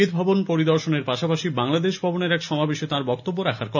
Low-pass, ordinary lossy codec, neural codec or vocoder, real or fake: 7.2 kHz; none; none; real